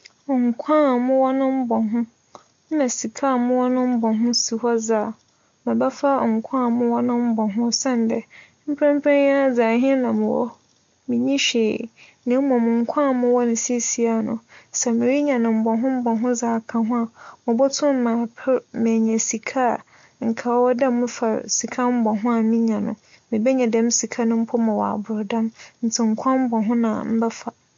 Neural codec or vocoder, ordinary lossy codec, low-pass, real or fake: none; MP3, 48 kbps; 7.2 kHz; real